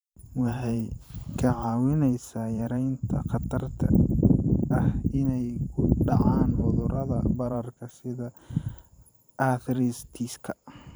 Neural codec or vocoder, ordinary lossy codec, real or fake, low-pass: vocoder, 44.1 kHz, 128 mel bands every 512 samples, BigVGAN v2; none; fake; none